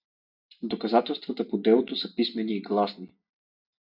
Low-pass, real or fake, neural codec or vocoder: 5.4 kHz; real; none